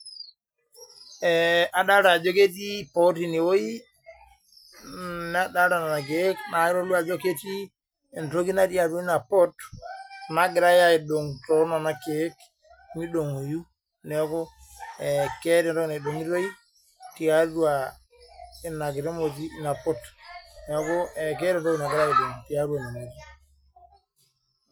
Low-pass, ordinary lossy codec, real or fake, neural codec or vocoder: none; none; real; none